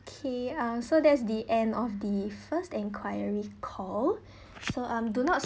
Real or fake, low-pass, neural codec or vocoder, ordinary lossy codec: real; none; none; none